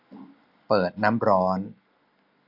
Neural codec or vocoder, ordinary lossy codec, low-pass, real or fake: none; none; 5.4 kHz; real